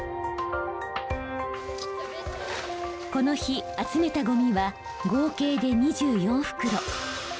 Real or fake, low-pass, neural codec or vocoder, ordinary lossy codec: real; none; none; none